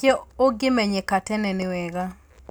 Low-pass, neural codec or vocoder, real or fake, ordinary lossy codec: none; none; real; none